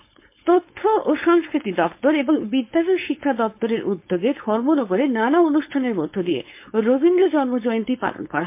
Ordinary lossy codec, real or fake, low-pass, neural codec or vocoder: MP3, 24 kbps; fake; 3.6 kHz; codec, 16 kHz, 4.8 kbps, FACodec